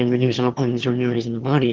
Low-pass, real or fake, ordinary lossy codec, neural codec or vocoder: 7.2 kHz; fake; Opus, 16 kbps; autoencoder, 22.05 kHz, a latent of 192 numbers a frame, VITS, trained on one speaker